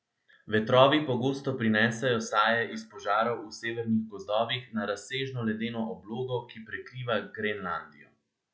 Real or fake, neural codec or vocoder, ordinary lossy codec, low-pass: real; none; none; none